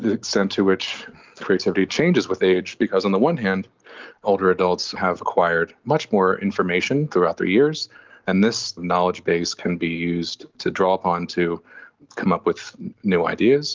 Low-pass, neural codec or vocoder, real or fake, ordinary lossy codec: 7.2 kHz; none; real; Opus, 24 kbps